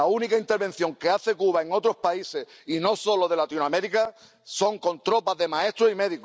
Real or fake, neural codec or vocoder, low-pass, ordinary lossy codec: real; none; none; none